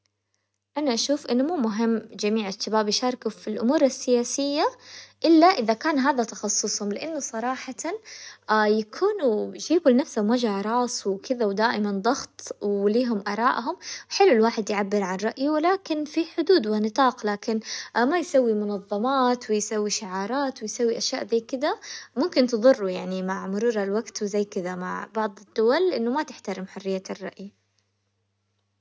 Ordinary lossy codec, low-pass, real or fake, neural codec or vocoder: none; none; real; none